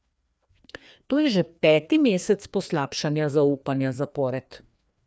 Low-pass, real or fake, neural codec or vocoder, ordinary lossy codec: none; fake; codec, 16 kHz, 2 kbps, FreqCodec, larger model; none